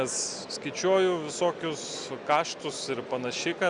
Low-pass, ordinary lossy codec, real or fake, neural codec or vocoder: 9.9 kHz; Opus, 64 kbps; real; none